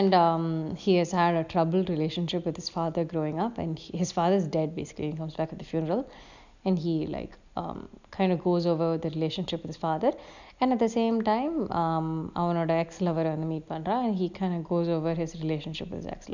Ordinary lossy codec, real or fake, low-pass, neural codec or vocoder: none; real; 7.2 kHz; none